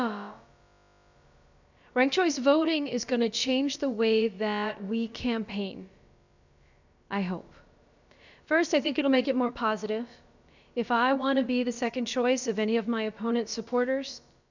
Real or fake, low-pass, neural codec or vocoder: fake; 7.2 kHz; codec, 16 kHz, about 1 kbps, DyCAST, with the encoder's durations